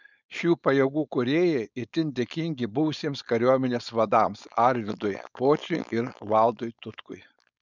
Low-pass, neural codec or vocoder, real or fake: 7.2 kHz; codec, 16 kHz, 4.8 kbps, FACodec; fake